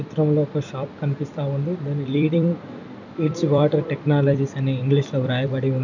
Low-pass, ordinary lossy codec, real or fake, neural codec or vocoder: 7.2 kHz; none; fake; vocoder, 44.1 kHz, 128 mel bands every 256 samples, BigVGAN v2